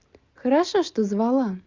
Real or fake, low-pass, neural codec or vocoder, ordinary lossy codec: real; 7.2 kHz; none; Opus, 64 kbps